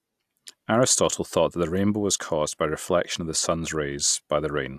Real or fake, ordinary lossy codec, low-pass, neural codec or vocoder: real; AAC, 96 kbps; 14.4 kHz; none